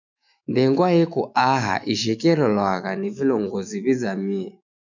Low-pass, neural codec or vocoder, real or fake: 7.2 kHz; autoencoder, 48 kHz, 128 numbers a frame, DAC-VAE, trained on Japanese speech; fake